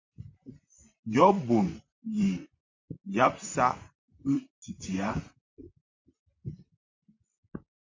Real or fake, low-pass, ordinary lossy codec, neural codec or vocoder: fake; 7.2 kHz; MP3, 48 kbps; vocoder, 44.1 kHz, 128 mel bands, Pupu-Vocoder